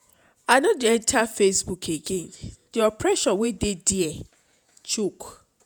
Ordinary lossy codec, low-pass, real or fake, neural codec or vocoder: none; none; fake; vocoder, 48 kHz, 128 mel bands, Vocos